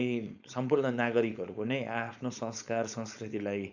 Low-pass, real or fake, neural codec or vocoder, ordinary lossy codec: 7.2 kHz; fake; codec, 16 kHz, 4.8 kbps, FACodec; none